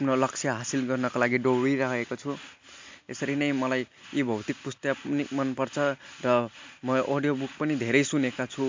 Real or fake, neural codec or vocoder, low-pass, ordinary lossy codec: real; none; 7.2 kHz; MP3, 64 kbps